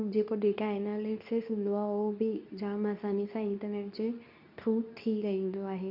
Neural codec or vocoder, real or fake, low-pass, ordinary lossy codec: codec, 24 kHz, 0.9 kbps, WavTokenizer, medium speech release version 2; fake; 5.4 kHz; none